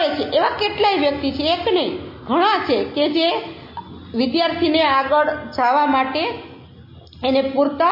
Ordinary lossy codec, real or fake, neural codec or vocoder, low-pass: MP3, 24 kbps; real; none; 5.4 kHz